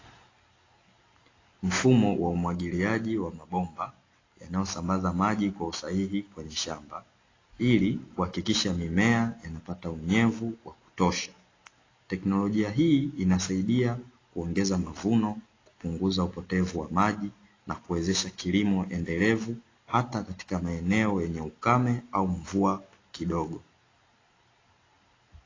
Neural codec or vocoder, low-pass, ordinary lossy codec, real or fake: none; 7.2 kHz; AAC, 32 kbps; real